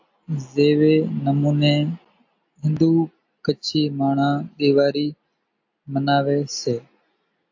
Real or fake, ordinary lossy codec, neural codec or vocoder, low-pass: real; AAC, 48 kbps; none; 7.2 kHz